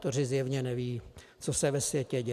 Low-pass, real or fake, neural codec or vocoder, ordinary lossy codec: 14.4 kHz; real; none; AAC, 96 kbps